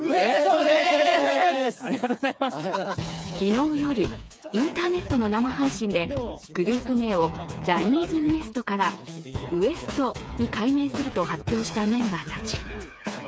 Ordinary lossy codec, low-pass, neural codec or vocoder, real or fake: none; none; codec, 16 kHz, 4 kbps, FreqCodec, smaller model; fake